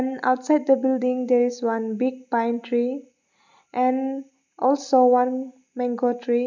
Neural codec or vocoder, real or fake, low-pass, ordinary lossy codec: none; real; 7.2 kHz; MP3, 64 kbps